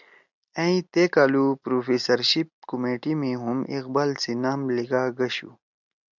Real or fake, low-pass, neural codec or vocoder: real; 7.2 kHz; none